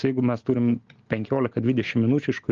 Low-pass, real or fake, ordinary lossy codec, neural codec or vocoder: 7.2 kHz; real; Opus, 32 kbps; none